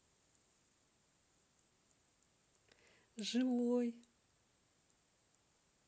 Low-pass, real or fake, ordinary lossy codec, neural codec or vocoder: none; real; none; none